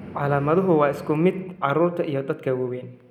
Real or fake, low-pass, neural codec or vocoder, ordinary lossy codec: real; 19.8 kHz; none; none